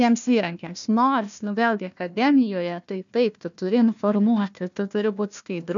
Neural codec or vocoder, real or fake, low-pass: codec, 16 kHz, 1 kbps, FunCodec, trained on Chinese and English, 50 frames a second; fake; 7.2 kHz